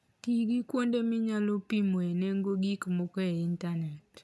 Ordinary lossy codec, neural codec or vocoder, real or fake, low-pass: none; none; real; none